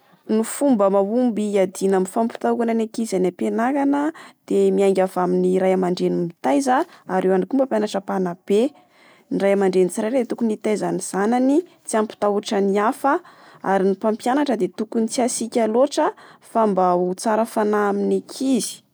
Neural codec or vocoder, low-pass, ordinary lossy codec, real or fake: none; none; none; real